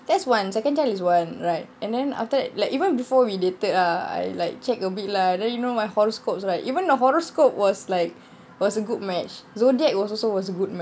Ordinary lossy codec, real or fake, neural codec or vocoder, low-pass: none; real; none; none